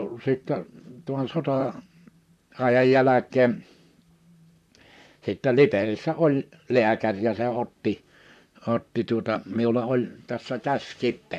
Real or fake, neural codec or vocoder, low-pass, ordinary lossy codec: fake; vocoder, 44.1 kHz, 128 mel bands, Pupu-Vocoder; 14.4 kHz; MP3, 96 kbps